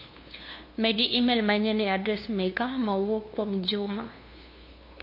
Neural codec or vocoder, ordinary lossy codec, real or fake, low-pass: codec, 16 kHz, 2 kbps, FunCodec, trained on LibriTTS, 25 frames a second; MP3, 32 kbps; fake; 5.4 kHz